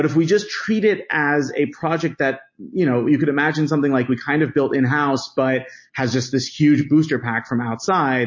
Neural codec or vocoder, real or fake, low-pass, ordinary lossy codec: none; real; 7.2 kHz; MP3, 32 kbps